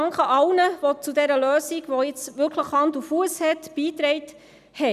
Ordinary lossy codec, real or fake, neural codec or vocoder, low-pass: none; real; none; 14.4 kHz